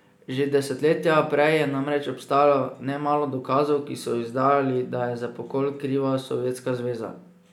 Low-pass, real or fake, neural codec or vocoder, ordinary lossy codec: 19.8 kHz; real; none; none